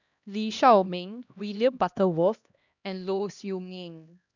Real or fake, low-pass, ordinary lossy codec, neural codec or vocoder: fake; 7.2 kHz; none; codec, 16 kHz, 1 kbps, X-Codec, HuBERT features, trained on LibriSpeech